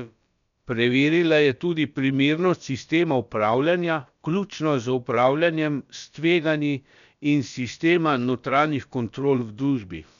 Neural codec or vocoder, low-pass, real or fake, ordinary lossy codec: codec, 16 kHz, about 1 kbps, DyCAST, with the encoder's durations; 7.2 kHz; fake; none